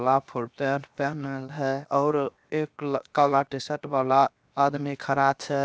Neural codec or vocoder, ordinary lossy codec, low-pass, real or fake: codec, 16 kHz, 0.7 kbps, FocalCodec; none; none; fake